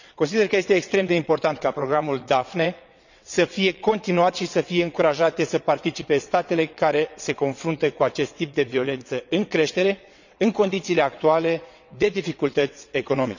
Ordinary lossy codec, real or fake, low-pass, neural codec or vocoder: none; fake; 7.2 kHz; vocoder, 22.05 kHz, 80 mel bands, WaveNeXt